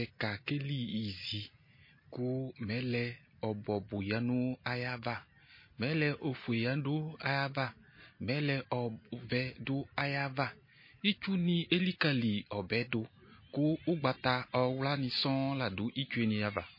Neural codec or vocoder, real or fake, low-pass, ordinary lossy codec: none; real; 5.4 kHz; MP3, 24 kbps